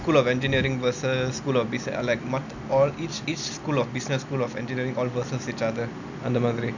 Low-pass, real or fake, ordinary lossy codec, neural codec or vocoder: 7.2 kHz; real; none; none